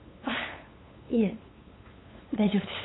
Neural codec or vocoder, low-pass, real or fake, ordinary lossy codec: codec, 16 kHz, 8 kbps, FunCodec, trained on LibriTTS, 25 frames a second; 7.2 kHz; fake; AAC, 16 kbps